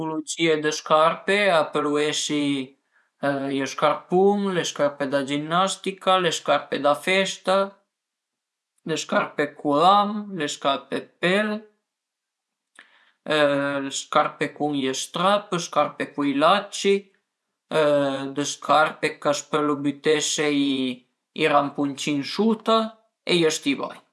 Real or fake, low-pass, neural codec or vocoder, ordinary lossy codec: fake; none; vocoder, 24 kHz, 100 mel bands, Vocos; none